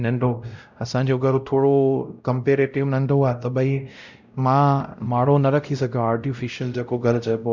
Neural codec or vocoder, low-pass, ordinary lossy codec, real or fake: codec, 16 kHz, 0.5 kbps, X-Codec, WavLM features, trained on Multilingual LibriSpeech; 7.2 kHz; none; fake